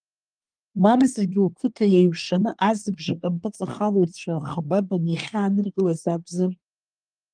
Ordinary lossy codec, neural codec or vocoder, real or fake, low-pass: Opus, 32 kbps; codec, 24 kHz, 1 kbps, SNAC; fake; 9.9 kHz